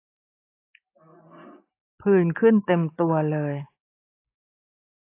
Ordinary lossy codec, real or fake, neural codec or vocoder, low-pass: AAC, 24 kbps; real; none; 3.6 kHz